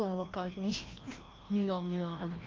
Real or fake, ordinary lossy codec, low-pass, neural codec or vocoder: fake; Opus, 24 kbps; 7.2 kHz; codec, 16 kHz, 1 kbps, FreqCodec, larger model